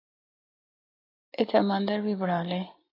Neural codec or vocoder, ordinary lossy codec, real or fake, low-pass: none; AAC, 24 kbps; real; 5.4 kHz